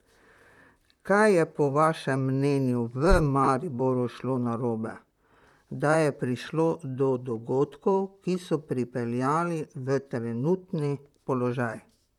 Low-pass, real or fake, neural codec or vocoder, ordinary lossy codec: 19.8 kHz; fake; vocoder, 44.1 kHz, 128 mel bands, Pupu-Vocoder; none